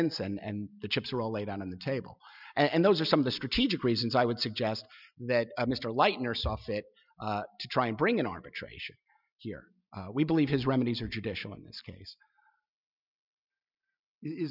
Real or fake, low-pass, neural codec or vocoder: real; 5.4 kHz; none